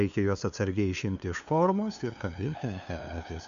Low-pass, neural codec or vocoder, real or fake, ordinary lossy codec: 7.2 kHz; codec, 16 kHz, 2 kbps, FunCodec, trained on LibriTTS, 25 frames a second; fake; MP3, 96 kbps